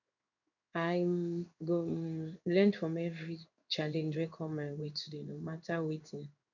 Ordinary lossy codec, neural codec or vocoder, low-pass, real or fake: none; codec, 16 kHz in and 24 kHz out, 1 kbps, XY-Tokenizer; 7.2 kHz; fake